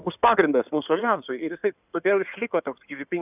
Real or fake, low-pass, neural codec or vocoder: fake; 3.6 kHz; codec, 16 kHz in and 24 kHz out, 2.2 kbps, FireRedTTS-2 codec